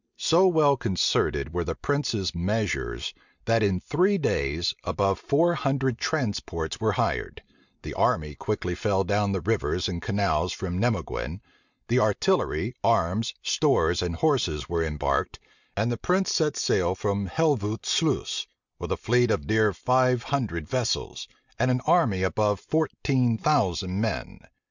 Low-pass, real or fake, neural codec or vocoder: 7.2 kHz; real; none